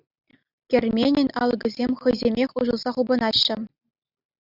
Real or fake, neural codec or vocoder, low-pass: real; none; 5.4 kHz